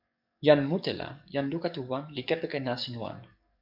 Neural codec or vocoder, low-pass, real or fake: codec, 44.1 kHz, 7.8 kbps, DAC; 5.4 kHz; fake